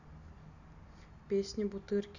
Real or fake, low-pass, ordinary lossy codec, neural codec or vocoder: real; 7.2 kHz; none; none